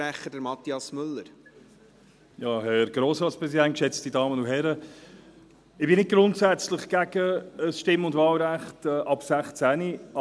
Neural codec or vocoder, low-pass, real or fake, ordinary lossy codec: none; none; real; none